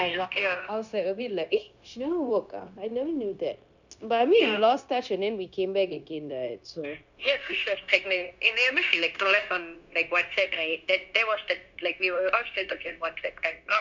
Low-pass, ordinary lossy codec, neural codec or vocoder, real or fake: 7.2 kHz; none; codec, 16 kHz, 0.9 kbps, LongCat-Audio-Codec; fake